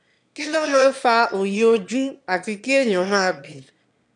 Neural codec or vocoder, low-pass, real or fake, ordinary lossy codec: autoencoder, 22.05 kHz, a latent of 192 numbers a frame, VITS, trained on one speaker; 9.9 kHz; fake; none